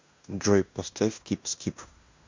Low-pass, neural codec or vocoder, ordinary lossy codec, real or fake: 7.2 kHz; codec, 16 kHz in and 24 kHz out, 0.9 kbps, LongCat-Audio-Codec, fine tuned four codebook decoder; MP3, 64 kbps; fake